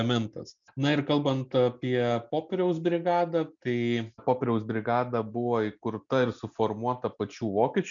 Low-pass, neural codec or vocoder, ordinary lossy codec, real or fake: 7.2 kHz; none; MP3, 96 kbps; real